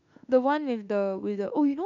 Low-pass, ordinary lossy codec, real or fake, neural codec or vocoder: 7.2 kHz; none; fake; autoencoder, 48 kHz, 32 numbers a frame, DAC-VAE, trained on Japanese speech